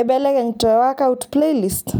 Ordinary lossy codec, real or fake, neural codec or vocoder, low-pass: none; real; none; none